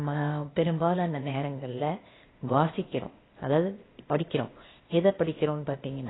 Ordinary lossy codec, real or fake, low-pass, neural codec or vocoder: AAC, 16 kbps; fake; 7.2 kHz; codec, 16 kHz, 0.8 kbps, ZipCodec